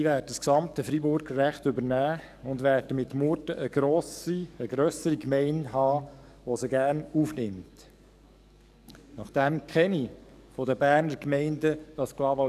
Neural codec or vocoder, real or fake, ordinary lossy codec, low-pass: codec, 44.1 kHz, 7.8 kbps, DAC; fake; none; 14.4 kHz